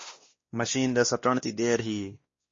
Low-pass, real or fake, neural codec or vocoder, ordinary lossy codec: 7.2 kHz; fake; codec, 16 kHz, 1 kbps, X-Codec, WavLM features, trained on Multilingual LibriSpeech; MP3, 32 kbps